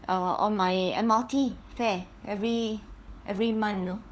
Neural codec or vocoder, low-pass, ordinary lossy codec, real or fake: codec, 16 kHz, 4 kbps, FunCodec, trained on LibriTTS, 50 frames a second; none; none; fake